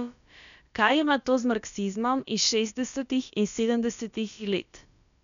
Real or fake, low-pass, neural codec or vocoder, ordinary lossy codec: fake; 7.2 kHz; codec, 16 kHz, about 1 kbps, DyCAST, with the encoder's durations; none